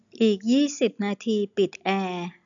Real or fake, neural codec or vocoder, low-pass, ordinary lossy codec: real; none; 7.2 kHz; none